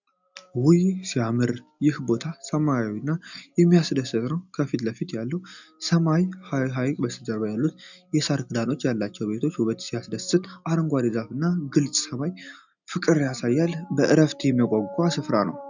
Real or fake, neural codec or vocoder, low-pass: real; none; 7.2 kHz